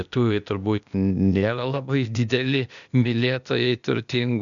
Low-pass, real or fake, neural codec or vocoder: 7.2 kHz; fake; codec, 16 kHz, 0.8 kbps, ZipCodec